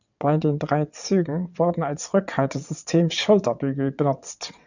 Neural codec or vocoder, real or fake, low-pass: vocoder, 22.05 kHz, 80 mel bands, WaveNeXt; fake; 7.2 kHz